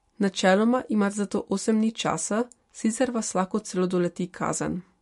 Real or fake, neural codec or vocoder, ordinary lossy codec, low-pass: real; none; MP3, 48 kbps; 14.4 kHz